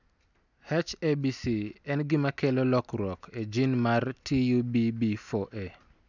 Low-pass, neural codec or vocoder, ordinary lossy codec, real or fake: 7.2 kHz; none; none; real